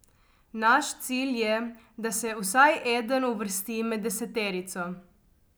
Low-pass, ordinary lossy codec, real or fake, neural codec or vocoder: none; none; real; none